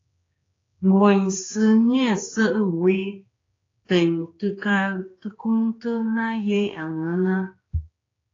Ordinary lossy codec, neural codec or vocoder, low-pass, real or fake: AAC, 32 kbps; codec, 16 kHz, 2 kbps, X-Codec, HuBERT features, trained on general audio; 7.2 kHz; fake